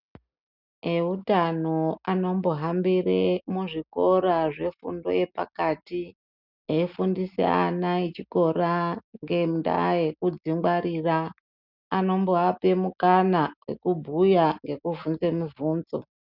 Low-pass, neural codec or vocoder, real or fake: 5.4 kHz; none; real